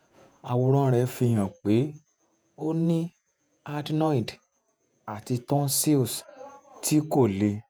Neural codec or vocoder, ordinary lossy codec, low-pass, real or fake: vocoder, 48 kHz, 128 mel bands, Vocos; none; none; fake